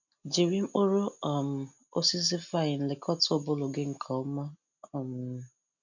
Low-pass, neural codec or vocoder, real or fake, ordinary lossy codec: 7.2 kHz; none; real; none